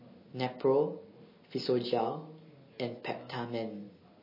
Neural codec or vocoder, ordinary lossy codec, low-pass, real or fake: none; MP3, 24 kbps; 5.4 kHz; real